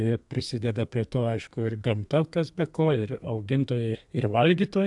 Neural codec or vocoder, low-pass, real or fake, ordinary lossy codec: codec, 32 kHz, 1.9 kbps, SNAC; 10.8 kHz; fake; AAC, 64 kbps